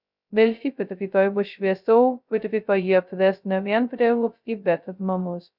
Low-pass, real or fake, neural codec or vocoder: 5.4 kHz; fake; codec, 16 kHz, 0.2 kbps, FocalCodec